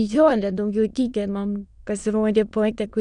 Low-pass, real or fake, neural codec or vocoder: 9.9 kHz; fake; autoencoder, 22.05 kHz, a latent of 192 numbers a frame, VITS, trained on many speakers